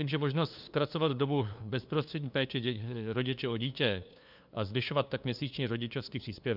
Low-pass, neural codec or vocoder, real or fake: 5.4 kHz; codec, 16 kHz, 2 kbps, FunCodec, trained on LibriTTS, 25 frames a second; fake